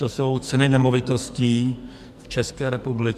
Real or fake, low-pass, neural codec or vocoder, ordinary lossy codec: fake; 14.4 kHz; codec, 44.1 kHz, 2.6 kbps, SNAC; MP3, 96 kbps